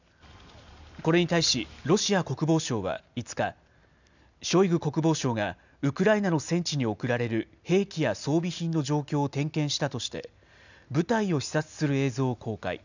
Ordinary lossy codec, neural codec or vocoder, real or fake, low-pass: none; none; real; 7.2 kHz